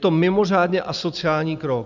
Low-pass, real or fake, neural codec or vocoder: 7.2 kHz; real; none